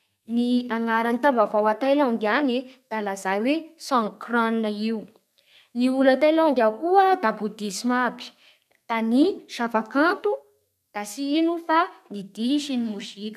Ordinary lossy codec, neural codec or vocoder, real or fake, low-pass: none; codec, 32 kHz, 1.9 kbps, SNAC; fake; 14.4 kHz